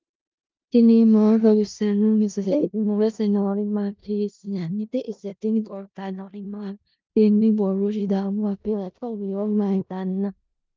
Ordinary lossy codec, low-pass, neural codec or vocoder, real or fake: Opus, 24 kbps; 7.2 kHz; codec, 16 kHz in and 24 kHz out, 0.4 kbps, LongCat-Audio-Codec, four codebook decoder; fake